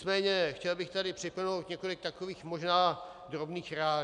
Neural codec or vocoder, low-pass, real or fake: none; 10.8 kHz; real